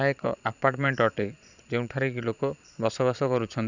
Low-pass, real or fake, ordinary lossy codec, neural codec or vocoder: 7.2 kHz; fake; none; vocoder, 22.05 kHz, 80 mel bands, Vocos